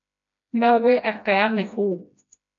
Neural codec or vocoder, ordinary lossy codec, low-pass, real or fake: codec, 16 kHz, 1 kbps, FreqCodec, smaller model; AAC, 64 kbps; 7.2 kHz; fake